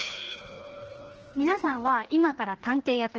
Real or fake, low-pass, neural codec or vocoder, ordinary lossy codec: fake; 7.2 kHz; codec, 16 kHz, 2 kbps, FreqCodec, larger model; Opus, 16 kbps